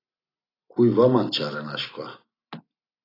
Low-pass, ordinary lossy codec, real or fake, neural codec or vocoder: 5.4 kHz; AAC, 24 kbps; real; none